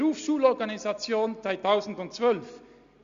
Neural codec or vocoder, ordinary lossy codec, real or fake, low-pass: none; Opus, 64 kbps; real; 7.2 kHz